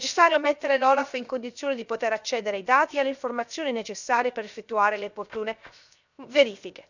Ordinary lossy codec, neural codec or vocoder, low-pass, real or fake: none; codec, 16 kHz, 0.7 kbps, FocalCodec; 7.2 kHz; fake